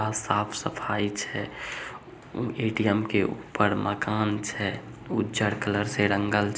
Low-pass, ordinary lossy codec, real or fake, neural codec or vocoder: none; none; real; none